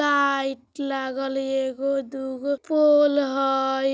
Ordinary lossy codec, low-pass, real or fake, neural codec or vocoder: none; none; real; none